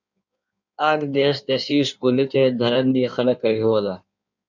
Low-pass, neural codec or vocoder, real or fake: 7.2 kHz; codec, 16 kHz in and 24 kHz out, 1.1 kbps, FireRedTTS-2 codec; fake